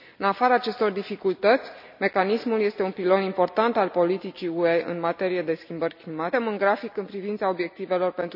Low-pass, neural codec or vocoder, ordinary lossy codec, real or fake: 5.4 kHz; none; none; real